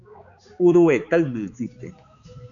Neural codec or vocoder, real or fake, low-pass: codec, 16 kHz, 4 kbps, X-Codec, HuBERT features, trained on balanced general audio; fake; 7.2 kHz